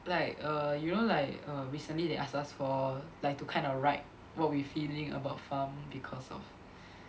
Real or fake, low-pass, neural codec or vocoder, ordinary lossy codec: real; none; none; none